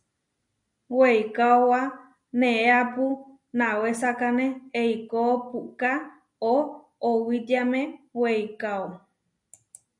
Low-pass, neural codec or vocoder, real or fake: 10.8 kHz; none; real